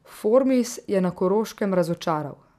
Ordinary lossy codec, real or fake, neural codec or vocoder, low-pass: none; real; none; 14.4 kHz